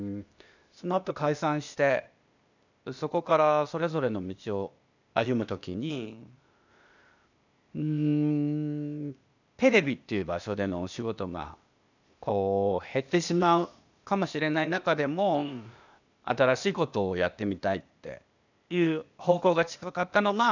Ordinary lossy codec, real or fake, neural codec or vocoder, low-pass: none; fake; codec, 16 kHz, 0.8 kbps, ZipCodec; 7.2 kHz